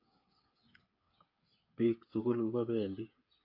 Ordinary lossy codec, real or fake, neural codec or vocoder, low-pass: AAC, 32 kbps; fake; codec, 16 kHz, 8 kbps, FreqCodec, smaller model; 5.4 kHz